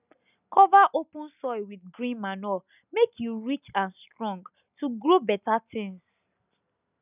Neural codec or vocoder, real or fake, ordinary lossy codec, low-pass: none; real; none; 3.6 kHz